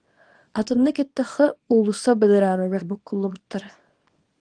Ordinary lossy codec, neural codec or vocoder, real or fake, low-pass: Opus, 24 kbps; codec, 24 kHz, 0.9 kbps, WavTokenizer, small release; fake; 9.9 kHz